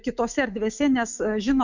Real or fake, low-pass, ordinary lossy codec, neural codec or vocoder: fake; 7.2 kHz; Opus, 64 kbps; autoencoder, 48 kHz, 128 numbers a frame, DAC-VAE, trained on Japanese speech